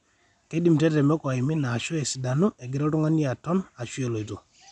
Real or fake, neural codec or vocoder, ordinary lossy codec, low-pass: real; none; none; 10.8 kHz